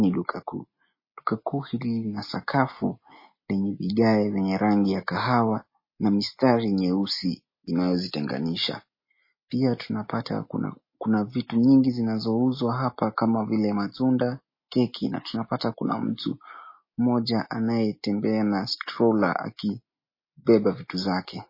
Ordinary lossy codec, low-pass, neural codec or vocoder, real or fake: MP3, 24 kbps; 5.4 kHz; none; real